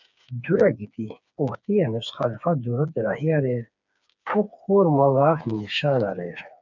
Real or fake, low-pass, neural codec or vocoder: fake; 7.2 kHz; codec, 16 kHz, 4 kbps, FreqCodec, smaller model